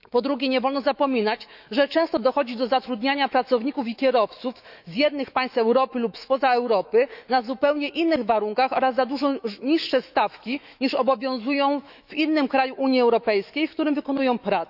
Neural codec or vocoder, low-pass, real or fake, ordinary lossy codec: autoencoder, 48 kHz, 128 numbers a frame, DAC-VAE, trained on Japanese speech; 5.4 kHz; fake; none